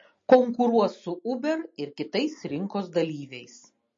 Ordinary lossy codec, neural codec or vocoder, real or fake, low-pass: MP3, 32 kbps; none; real; 7.2 kHz